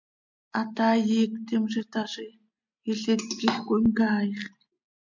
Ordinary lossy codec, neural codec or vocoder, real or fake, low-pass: MP3, 64 kbps; none; real; 7.2 kHz